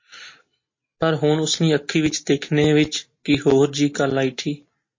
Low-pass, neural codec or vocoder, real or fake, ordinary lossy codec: 7.2 kHz; none; real; MP3, 32 kbps